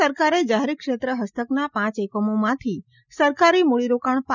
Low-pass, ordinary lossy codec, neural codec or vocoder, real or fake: 7.2 kHz; none; none; real